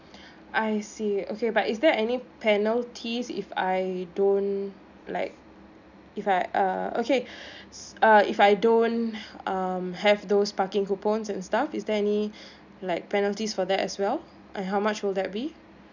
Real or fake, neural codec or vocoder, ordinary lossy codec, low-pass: real; none; none; 7.2 kHz